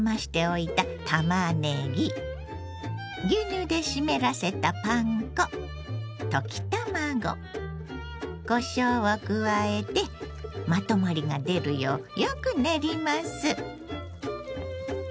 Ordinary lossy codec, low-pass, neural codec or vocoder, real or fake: none; none; none; real